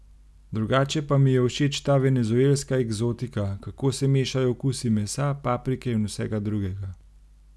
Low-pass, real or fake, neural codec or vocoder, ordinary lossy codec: none; real; none; none